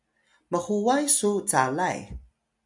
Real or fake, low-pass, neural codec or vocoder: real; 10.8 kHz; none